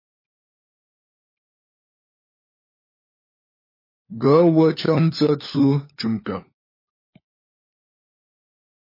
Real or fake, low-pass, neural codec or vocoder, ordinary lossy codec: fake; 5.4 kHz; codec, 16 kHz, 4 kbps, FunCodec, trained on LibriTTS, 50 frames a second; MP3, 24 kbps